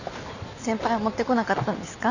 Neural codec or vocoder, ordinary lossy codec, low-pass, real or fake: none; none; 7.2 kHz; real